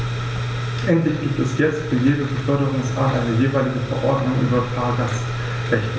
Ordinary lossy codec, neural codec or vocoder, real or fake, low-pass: none; none; real; none